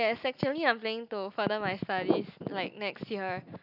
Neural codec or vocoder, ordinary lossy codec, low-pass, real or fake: none; none; 5.4 kHz; real